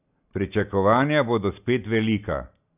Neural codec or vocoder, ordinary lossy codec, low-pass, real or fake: none; none; 3.6 kHz; real